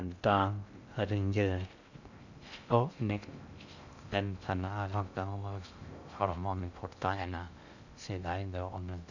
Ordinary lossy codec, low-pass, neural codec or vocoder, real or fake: none; 7.2 kHz; codec, 16 kHz in and 24 kHz out, 0.6 kbps, FocalCodec, streaming, 2048 codes; fake